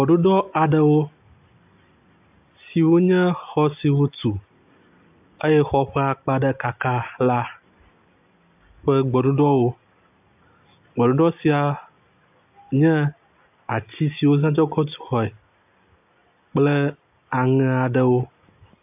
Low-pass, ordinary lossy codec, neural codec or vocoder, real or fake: 3.6 kHz; AAC, 32 kbps; none; real